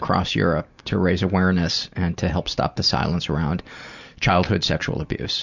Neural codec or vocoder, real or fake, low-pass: none; real; 7.2 kHz